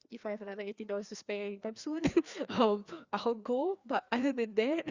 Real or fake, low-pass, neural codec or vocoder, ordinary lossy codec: fake; 7.2 kHz; codec, 16 kHz, 2 kbps, FreqCodec, larger model; Opus, 64 kbps